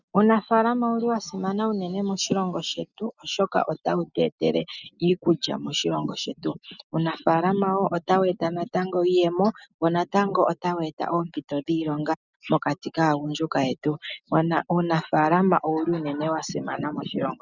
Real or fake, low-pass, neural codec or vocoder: real; 7.2 kHz; none